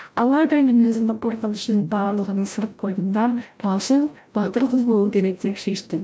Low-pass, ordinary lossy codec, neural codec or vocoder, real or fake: none; none; codec, 16 kHz, 0.5 kbps, FreqCodec, larger model; fake